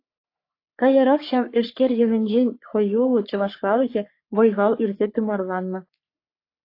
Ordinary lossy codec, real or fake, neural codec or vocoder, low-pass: AAC, 32 kbps; fake; codec, 44.1 kHz, 3.4 kbps, Pupu-Codec; 5.4 kHz